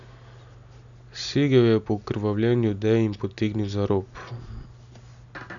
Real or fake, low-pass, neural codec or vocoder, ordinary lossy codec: real; 7.2 kHz; none; none